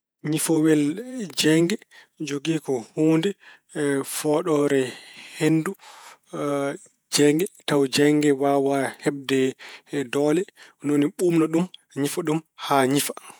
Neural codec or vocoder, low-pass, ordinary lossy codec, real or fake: vocoder, 48 kHz, 128 mel bands, Vocos; none; none; fake